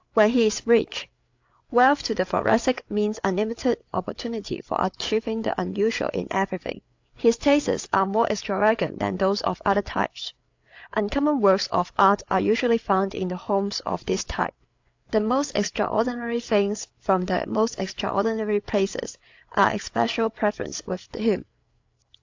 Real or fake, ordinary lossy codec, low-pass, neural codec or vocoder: fake; AAC, 48 kbps; 7.2 kHz; codec, 16 kHz, 8 kbps, FreqCodec, larger model